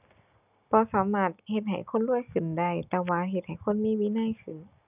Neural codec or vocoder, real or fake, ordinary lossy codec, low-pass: none; real; none; 3.6 kHz